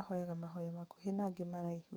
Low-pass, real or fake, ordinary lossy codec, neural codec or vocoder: none; fake; none; codec, 44.1 kHz, 7.8 kbps, DAC